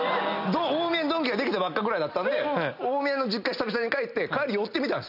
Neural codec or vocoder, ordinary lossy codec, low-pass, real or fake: none; none; 5.4 kHz; real